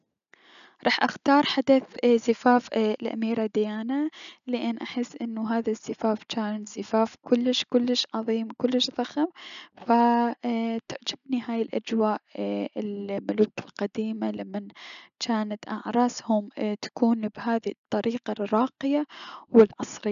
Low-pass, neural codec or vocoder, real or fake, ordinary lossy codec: 7.2 kHz; none; real; none